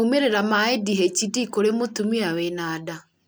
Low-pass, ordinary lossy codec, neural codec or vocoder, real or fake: none; none; none; real